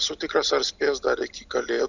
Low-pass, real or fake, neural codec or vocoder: 7.2 kHz; real; none